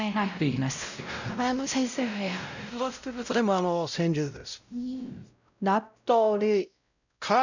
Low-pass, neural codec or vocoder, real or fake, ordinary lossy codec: 7.2 kHz; codec, 16 kHz, 0.5 kbps, X-Codec, WavLM features, trained on Multilingual LibriSpeech; fake; none